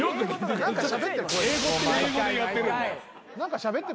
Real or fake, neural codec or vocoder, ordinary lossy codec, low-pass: real; none; none; none